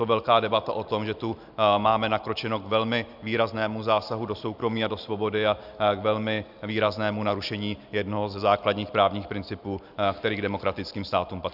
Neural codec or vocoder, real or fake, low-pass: none; real; 5.4 kHz